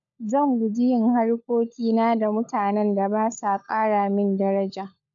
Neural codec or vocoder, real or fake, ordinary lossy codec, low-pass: codec, 16 kHz, 16 kbps, FunCodec, trained on LibriTTS, 50 frames a second; fake; none; 7.2 kHz